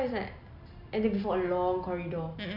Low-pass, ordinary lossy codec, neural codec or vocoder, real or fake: 5.4 kHz; MP3, 48 kbps; none; real